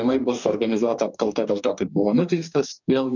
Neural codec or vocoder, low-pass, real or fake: codec, 44.1 kHz, 2.6 kbps, DAC; 7.2 kHz; fake